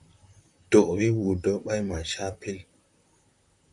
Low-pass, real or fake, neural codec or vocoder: 10.8 kHz; fake; vocoder, 44.1 kHz, 128 mel bands, Pupu-Vocoder